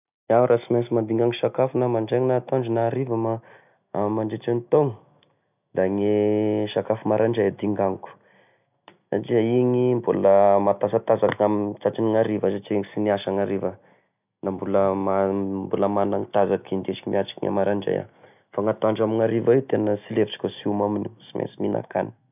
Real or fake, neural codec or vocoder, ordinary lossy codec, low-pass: real; none; none; 3.6 kHz